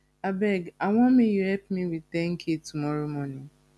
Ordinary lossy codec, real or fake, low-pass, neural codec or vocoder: none; real; none; none